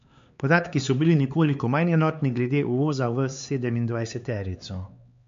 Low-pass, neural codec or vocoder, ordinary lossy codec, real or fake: 7.2 kHz; codec, 16 kHz, 4 kbps, X-Codec, HuBERT features, trained on LibriSpeech; MP3, 48 kbps; fake